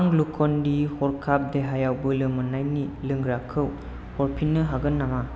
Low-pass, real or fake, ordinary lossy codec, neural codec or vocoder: none; real; none; none